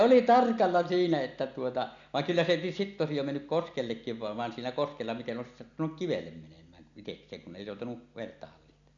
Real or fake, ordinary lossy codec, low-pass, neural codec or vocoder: real; none; 7.2 kHz; none